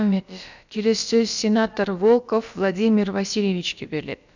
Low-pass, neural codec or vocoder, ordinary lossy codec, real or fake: 7.2 kHz; codec, 16 kHz, about 1 kbps, DyCAST, with the encoder's durations; none; fake